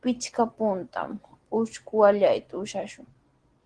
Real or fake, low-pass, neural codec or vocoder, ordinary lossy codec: real; 9.9 kHz; none; Opus, 16 kbps